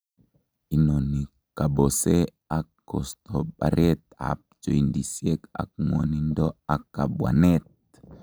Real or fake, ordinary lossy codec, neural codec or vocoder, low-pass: real; none; none; none